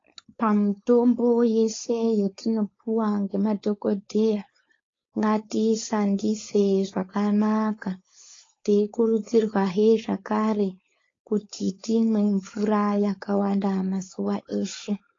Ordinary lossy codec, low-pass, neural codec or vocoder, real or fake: AAC, 32 kbps; 7.2 kHz; codec, 16 kHz, 4.8 kbps, FACodec; fake